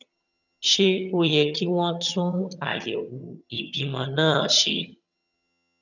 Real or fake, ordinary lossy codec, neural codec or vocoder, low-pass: fake; none; vocoder, 22.05 kHz, 80 mel bands, HiFi-GAN; 7.2 kHz